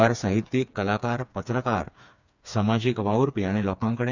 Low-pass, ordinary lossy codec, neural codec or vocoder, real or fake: 7.2 kHz; none; codec, 16 kHz, 4 kbps, FreqCodec, smaller model; fake